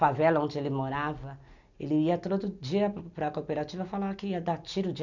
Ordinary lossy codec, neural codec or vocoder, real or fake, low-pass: none; none; real; 7.2 kHz